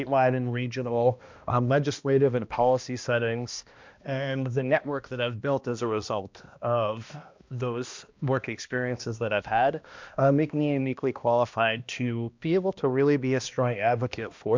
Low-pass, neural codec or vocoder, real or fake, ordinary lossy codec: 7.2 kHz; codec, 16 kHz, 1 kbps, X-Codec, HuBERT features, trained on balanced general audio; fake; MP3, 64 kbps